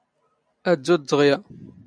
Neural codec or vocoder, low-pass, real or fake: none; 9.9 kHz; real